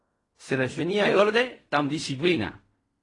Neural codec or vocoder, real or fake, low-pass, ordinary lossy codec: codec, 16 kHz in and 24 kHz out, 0.4 kbps, LongCat-Audio-Codec, fine tuned four codebook decoder; fake; 10.8 kHz; AAC, 32 kbps